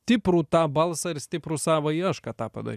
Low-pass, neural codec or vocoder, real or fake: 14.4 kHz; none; real